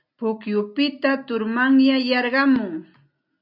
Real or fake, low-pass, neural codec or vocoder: real; 5.4 kHz; none